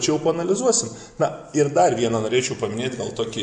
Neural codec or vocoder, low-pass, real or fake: none; 9.9 kHz; real